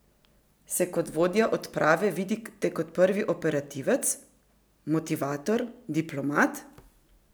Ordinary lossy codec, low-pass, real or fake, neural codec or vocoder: none; none; real; none